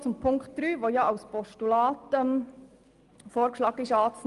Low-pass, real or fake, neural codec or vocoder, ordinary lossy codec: 10.8 kHz; real; none; Opus, 32 kbps